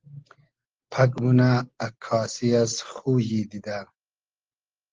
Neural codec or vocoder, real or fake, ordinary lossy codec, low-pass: none; real; Opus, 16 kbps; 7.2 kHz